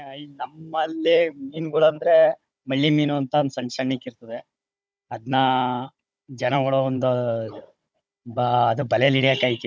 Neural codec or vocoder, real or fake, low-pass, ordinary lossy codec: codec, 16 kHz, 16 kbps, FunCodec, trained on Chinese and English, 50 frames a second; fake; none; none